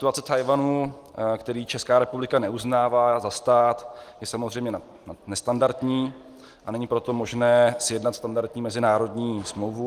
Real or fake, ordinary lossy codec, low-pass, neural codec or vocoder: real; Opus, 24 kbps; 14.4 kHz; none